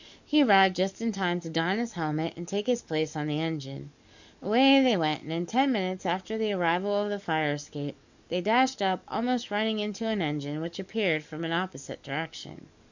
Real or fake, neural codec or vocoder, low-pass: fake; codec, 44.1 kHz, 7.8 kbps, DAC; 7.2 kHz